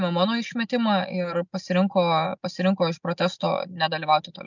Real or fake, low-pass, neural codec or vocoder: real; 7.2 kHz; none